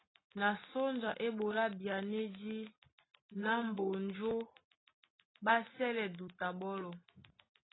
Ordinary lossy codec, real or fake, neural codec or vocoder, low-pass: AAC, 16 kbps; real; none; 7.2 kHz